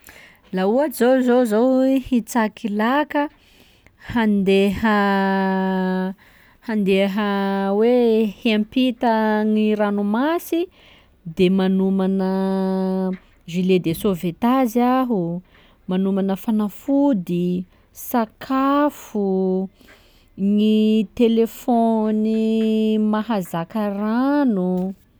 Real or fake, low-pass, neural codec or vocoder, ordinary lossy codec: real; none; none; none